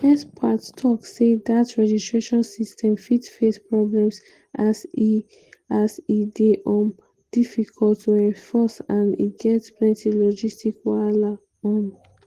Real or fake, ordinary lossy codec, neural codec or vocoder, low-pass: real; Opus, 16 kbps; none; 14.4 kHz